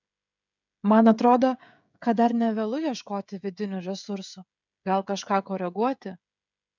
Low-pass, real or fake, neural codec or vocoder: 7.2 kHz; fake; codec, 16 kHz, 16 kbps, FreqCodec, smaller model